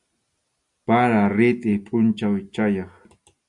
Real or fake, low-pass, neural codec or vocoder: real; 10.8 kHz; none